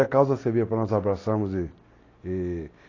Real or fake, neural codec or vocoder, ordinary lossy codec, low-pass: real; none; AAC, 32 kbps; 7.2 kHz